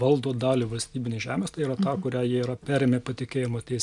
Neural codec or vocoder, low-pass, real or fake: none; 10.8 kHz; real